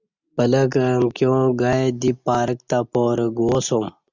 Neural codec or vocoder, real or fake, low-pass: none; real; 7.2 kHz